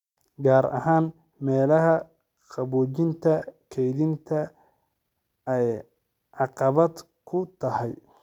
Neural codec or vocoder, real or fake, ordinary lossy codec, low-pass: none; real; none; 19.8 kHz